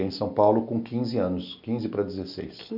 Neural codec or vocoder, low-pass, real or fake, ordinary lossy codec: none; 5.4 kHz; real; none